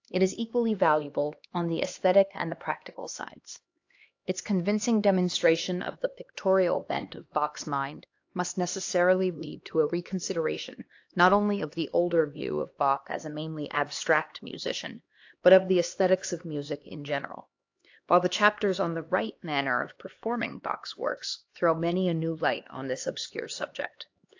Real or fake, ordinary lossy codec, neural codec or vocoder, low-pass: fake; AAC, 48 kbps; codec, 16 kHz, 2 kbps, X-Codec, HuBERT features, trained on LibriSpeech; 7.2 kHz